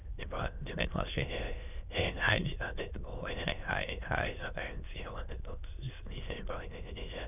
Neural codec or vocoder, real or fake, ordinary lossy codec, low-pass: autoencoder, 22.05 kHz, a latent of 192 numbers a frame, VITS, trained on many speakers; fake; none; 3.6 kHz